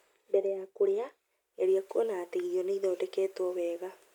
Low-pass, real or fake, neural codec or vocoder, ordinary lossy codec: 19.8 kHz; real; none; none